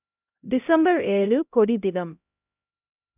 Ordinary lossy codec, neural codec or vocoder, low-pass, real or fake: none; codec, 16 kHz, 0.5 kbps, X-Codec, HuBERT features, trained on LibriSpeech; 3.6 kHz; fake